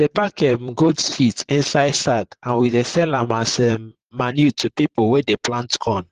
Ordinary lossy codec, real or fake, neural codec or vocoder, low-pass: Opus, 16 kbps; fake; vocoder, 44.1 kHz, 128 mel bands, Pupu-Vocoder; 14.4 kHz